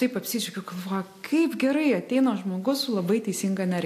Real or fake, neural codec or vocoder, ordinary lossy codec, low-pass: real; none; AAC, 64 kbps; 14.4 kHz